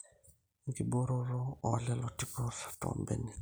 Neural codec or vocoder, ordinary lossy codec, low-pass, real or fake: none; none; none; real